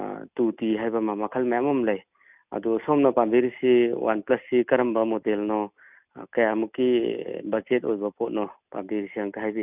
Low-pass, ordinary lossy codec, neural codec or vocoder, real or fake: 3.6 kHz; none; none; real